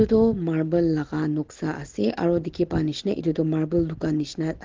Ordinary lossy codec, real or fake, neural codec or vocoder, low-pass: Opus, 16 kbps; real; none; 7.2 kHz